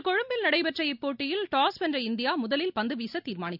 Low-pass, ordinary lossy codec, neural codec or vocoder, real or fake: 5.4 kHz; none; none; real